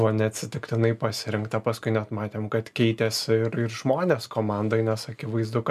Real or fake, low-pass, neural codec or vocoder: real; 14.4 kHz; none